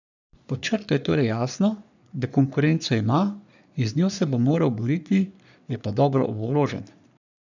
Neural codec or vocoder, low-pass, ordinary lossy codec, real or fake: codec, 44.1 kHz, 3.4 kbps, Pupu-Codec; 7.2 kHz; none; fake